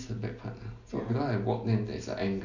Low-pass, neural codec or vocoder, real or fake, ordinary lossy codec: 7.2 kHz; none; real; none